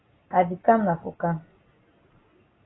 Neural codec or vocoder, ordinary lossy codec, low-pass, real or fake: none; AAC, 16 kbps; 7.2 kHz; real